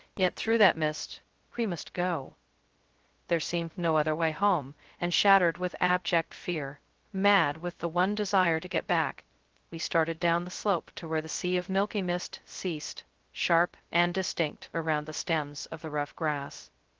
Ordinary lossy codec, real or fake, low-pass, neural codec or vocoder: Opus, 16 kbps; fake; 7.2 kHz; codec, 16 kHz, 0.2 kbps, FocalCodec